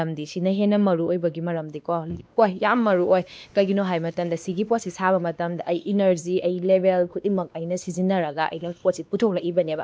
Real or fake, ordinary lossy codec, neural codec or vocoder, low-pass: fake; none; codec, 16 kHz, 2 kbps, X-Codec, WavLM features, trained on Multilingual LibriSpeech; none